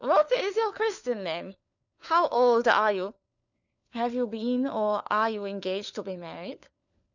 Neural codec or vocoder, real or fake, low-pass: codec, 16 kHz, 4 kbps, FunCodec, trained on LibriTTS, 50 frames a second; fake; 7.2 kHz